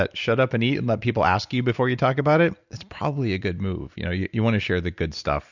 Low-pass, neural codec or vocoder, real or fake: 7.2 kHz; none; real